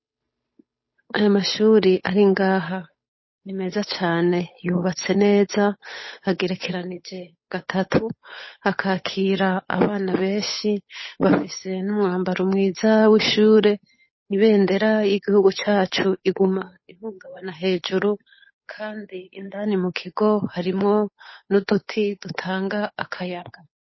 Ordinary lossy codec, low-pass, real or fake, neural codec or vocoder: MP3, 24 kbps; 7.2 kHz; fake; codec, 16 kHz, 8 kbps, FunCodec, trained on Chinese and English, 25 frames a second